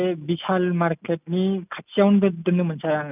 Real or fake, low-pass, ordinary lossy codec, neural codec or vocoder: real; 3.6 kHz; none; none